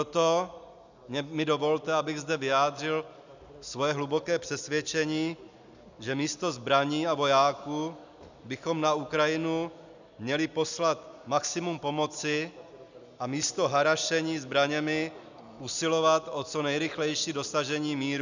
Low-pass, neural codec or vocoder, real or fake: 7.2 kHz; none; real